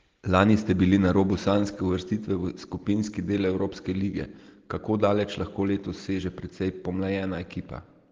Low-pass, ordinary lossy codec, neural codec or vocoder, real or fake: 7.2 kHz; Opus, 16 kbps; none; real